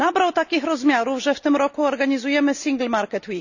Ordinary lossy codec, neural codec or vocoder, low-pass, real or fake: none; none; 7.2 kHz; real